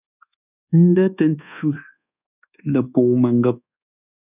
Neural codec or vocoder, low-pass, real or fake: codec, 24 kHz, 1.2 kbps, DualCodec; 3.6 kHz; fake